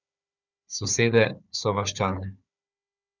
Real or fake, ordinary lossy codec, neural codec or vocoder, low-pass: fake; none; codec, 16 kHz, 4 kbps, FunCodec, trained on Chinese and English, 50 frames a second; 7.2 kHz